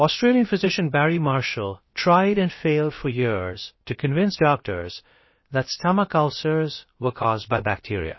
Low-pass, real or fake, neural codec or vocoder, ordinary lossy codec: 7.2 kHz; fake; codec, 16 kHz, about 1 kbps, DyCAST, with the encoder's durations; MP3, 24 kbps